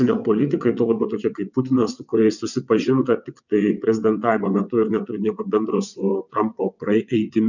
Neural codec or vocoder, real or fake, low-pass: vocoder, 22.05 kHz, 80 mel bands, WaveNeXt; fake; 7.2 kHz